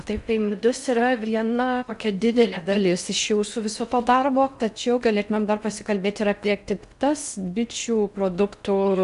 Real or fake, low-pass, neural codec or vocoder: fake; 10.8 kHz; codec, 16 kHz in and 24 kHz out, 0.6 kbps, FocalCodec, streaming, 4096 codes